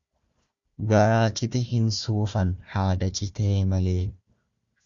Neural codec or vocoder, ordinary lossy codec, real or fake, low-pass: codec, 16 kHz, 1 kbps, FunCodec, trained on Chinese and English, 50 frames a second; Opus, 64 kbps; fake; 7.2 kHz